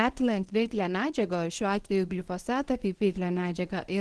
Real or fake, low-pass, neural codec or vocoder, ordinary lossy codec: fake; 10.8 kHz; codec, 24 kHz, 0.9 kbps, WavTokenizer, medium speech release version 1; Opus, 16 kbps